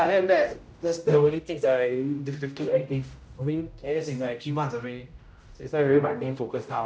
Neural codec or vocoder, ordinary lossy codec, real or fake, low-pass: codec, 16 kHz, 0.5 kbps, X-Codec, HuBERT features, trained on general audio; none; fake; none